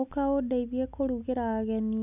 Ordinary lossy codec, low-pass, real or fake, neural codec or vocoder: none; 3.6 kHz; real; none